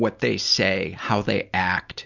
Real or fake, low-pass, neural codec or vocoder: real; 7.2 kHz; none